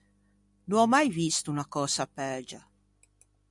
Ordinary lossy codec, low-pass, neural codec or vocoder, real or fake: MP3, 96 kbps; 10.8 kHz; none; real